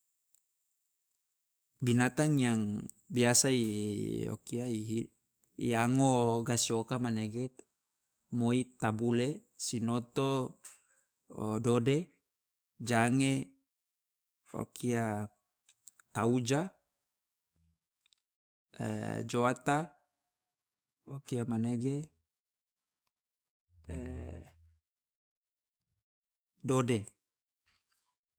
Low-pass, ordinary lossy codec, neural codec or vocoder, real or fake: none; none; codec, 44.1 kHz, 7.8 kbps, DAC; fake